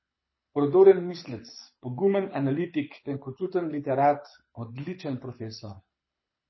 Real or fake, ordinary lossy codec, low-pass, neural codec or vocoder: fake; MP3, 24 kbps; 7.2 kHz; codec, 24 kHz, 6 kbps, HILCodec